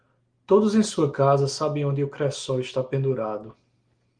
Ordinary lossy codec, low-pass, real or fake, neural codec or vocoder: Opus, 24 kbps; 9.9 kHz; real; none